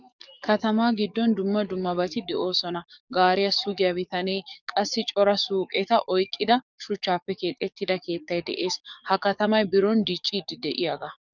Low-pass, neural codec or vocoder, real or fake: 7.2 kHz; codec, 44.1 kHz, 7.8 kbps, DAC; fake